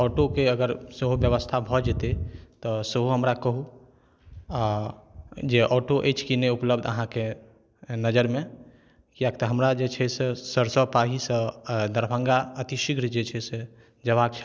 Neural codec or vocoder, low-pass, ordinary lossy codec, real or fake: none; none; none; real